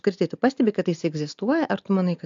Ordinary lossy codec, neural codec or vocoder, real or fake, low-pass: AAC, 64 kbps; none; real; 7.2 kHz